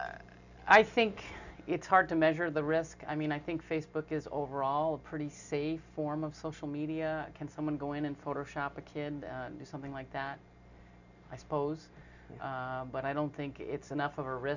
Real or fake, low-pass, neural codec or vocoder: real; 7.2 kHz; none